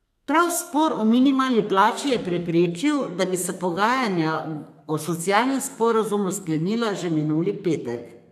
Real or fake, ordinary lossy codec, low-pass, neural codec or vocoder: fake; none; 14.4 kHz; codec, 32 kHz, 1.9 kbps, SNAC